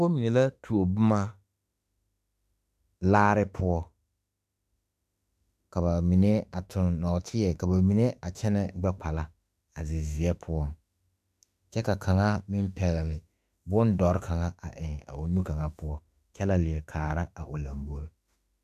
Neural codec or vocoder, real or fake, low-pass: autoencoder, 48 kHz, 32 numbers a frame, DAC-VAE, trained on Japanese speech; fake; 14.4 kHz